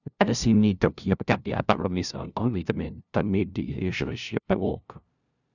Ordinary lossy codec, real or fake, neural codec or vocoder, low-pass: none; fake; codec, 16 kHz, 0.5 kbps, FunCodec, trained on LibriTTS, 25 frames a second; 7.2 kHz